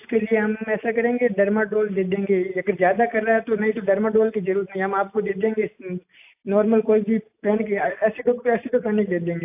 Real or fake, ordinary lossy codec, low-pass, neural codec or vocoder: real; AAC, 32 kbps; 3.6 kHz; none